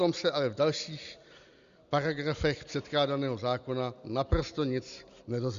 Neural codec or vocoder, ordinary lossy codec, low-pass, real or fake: none; Opus, 64 kbps; 7.2 kHz; real